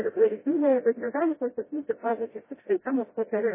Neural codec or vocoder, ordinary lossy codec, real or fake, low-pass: codec, 16 kHz, 0.5 kbps, FreqCodec, smaller model; MP3, 16 kbps; fake; 3.6 kHz